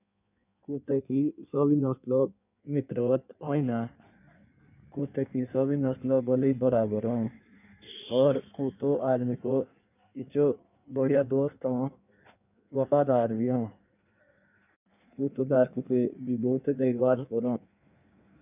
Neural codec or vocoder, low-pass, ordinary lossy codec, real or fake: codec, 16 kHz in and 24 kHz out, 1.1 kbps, FireRedTTS-2 codec; 3.6 kHz; none; fake